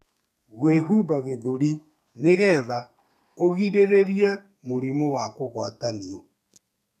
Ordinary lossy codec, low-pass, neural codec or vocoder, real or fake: none; 14.4 kHz; codec, 32 kHz, 1.9 kbps, SNAC; fake